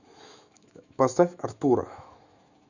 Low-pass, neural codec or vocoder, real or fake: 7.2 kHz; autoencoder, 48 kHz, 128 numbers a frame, DAC-VAE, trained on Japanese speech; fake